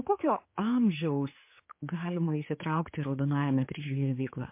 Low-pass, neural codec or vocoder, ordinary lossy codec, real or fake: 3.6 kHz; codec, 16 kHz, 4 kbps, X-Codec, HuBERT features, trained on general audio; MP3, 24 kbps; fake